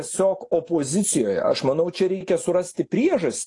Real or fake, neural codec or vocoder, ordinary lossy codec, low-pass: real; none; AAC, 48 kbps; 10.8 kHz